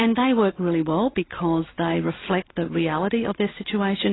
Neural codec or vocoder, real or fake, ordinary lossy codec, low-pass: none; real; AAC, 16 kbps; 7.2 kHz